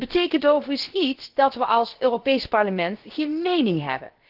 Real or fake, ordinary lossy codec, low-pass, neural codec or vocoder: fake; Opus, 16 kbps; 5.4 kHz; codec, 16 kHz, about 1 kbps, DyCAST, with the encoder's durations